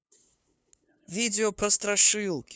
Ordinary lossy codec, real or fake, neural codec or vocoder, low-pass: none; fake; codec, 16 kHz, 2 kbps, FunCodec, trained on LibriTTS, 25 frames a second; none